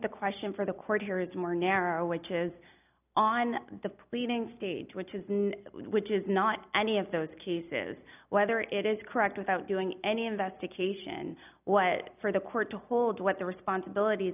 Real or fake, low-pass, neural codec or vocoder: real; 3.6 kHz; none